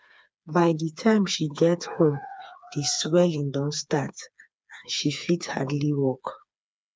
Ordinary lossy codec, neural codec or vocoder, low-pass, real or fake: none; codec, 16 kHz, 4 kbps, FreqCodec, smaller model; none; fake